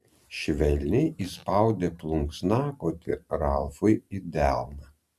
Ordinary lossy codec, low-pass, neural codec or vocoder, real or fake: MP3, 96 kbps; 14.4 kHz; vocoder, 44.1 kHz, 128 mel bands every 512 samples, BigVGAN v2; fake